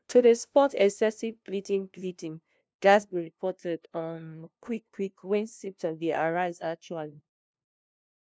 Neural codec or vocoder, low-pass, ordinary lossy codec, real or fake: codec, 16 kHz, 0.5 kbps, FunCodec, trained on LibriTTS, 25 frames a second; none; none; fake